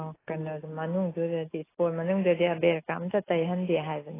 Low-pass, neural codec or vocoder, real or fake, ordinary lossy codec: 3.6 kHz; none; real; AAC, 16 kbps